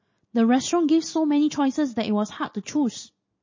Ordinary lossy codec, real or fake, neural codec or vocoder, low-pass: MP3, 32 kbps; real; none; 7.2 kHz